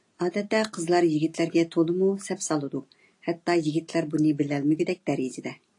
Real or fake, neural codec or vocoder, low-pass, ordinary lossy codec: real; none; 10.8 kHz; AAC, 48 kbps